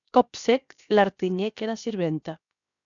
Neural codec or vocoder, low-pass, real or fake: codec, 16 kHz, 0.7 kbps, FocalCodec; 7.2 kHz; fake